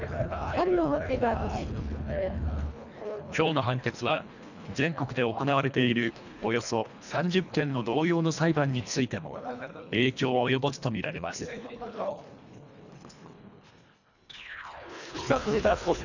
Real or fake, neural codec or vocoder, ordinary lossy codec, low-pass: fake; codec, 24 kHz, 1.5 kbps, HILCodec; none; 7.2 kHz